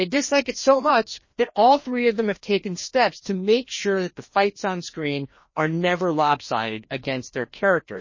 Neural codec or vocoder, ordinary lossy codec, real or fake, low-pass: codec, 16 kHz, 1 kbps, FreqCodec, larger model; MP3, 32 kbps; fake; 7.2 kHz